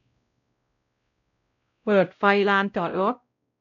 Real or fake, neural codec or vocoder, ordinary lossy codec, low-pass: fake; codec, 16 kHz, 0.5 kbps, X-Codec, WavLM features, trained on Multilingual LibriSpeech; none; 7.2 kHz